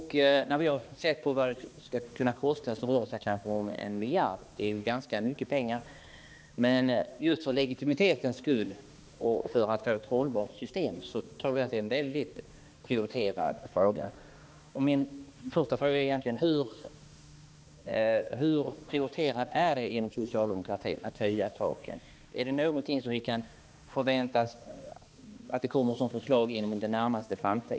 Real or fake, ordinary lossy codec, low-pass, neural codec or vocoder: fake; none; none; codec, 16 kHz, 2 kbps, X-Codec, HuBERT features, trained on balanced general audio